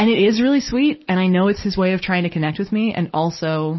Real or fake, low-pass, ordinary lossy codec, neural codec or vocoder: real; 7.2 kHz; MP3, 24 kbps; none